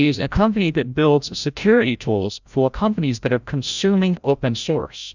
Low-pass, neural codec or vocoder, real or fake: 7.2 kHz; codec, 16 kHz, 0.5 kbps, FreqCodec, larger model; fake